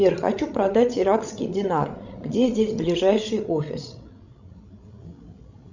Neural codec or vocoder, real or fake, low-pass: codec, 16 kHz, 16 kbps, FreqCodec, larger model; fake; 7.2 kHz